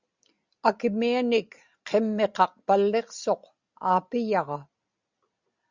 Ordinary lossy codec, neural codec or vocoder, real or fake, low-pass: Opus, 64 kbps; none; real; 7.2 kHz